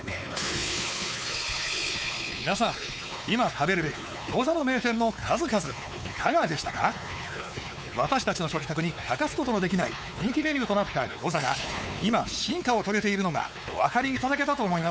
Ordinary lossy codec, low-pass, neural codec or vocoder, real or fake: none; none; codec, 16 kHz, 4 kbps, X-Codec, WavLM features, trained on Multilingual LibriSpeech; fake